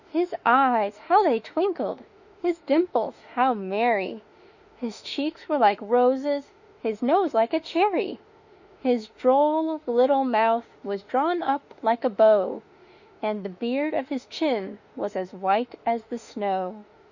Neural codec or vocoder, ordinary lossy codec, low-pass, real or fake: autoencoder, 48 kHz, 32 numbers a frame, DAC-VAE, trained on Japanese speech; Opus, 64 kbps; 7.2 kHz; fake